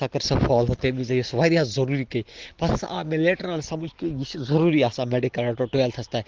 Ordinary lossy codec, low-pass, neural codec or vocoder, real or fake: Opus, 16 kbps; 7.2 kHz; none; real